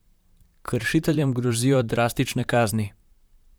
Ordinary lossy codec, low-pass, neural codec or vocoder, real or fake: none; none; vocoder, 44.1 kHz, 128 mel bands, Pupu-Vocoder; fake